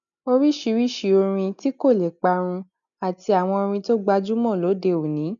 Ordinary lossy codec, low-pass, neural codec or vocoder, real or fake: none; 7.2 kHz; none; real